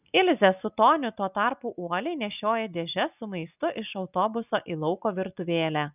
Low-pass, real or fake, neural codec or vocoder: 3.6 kHz; real; none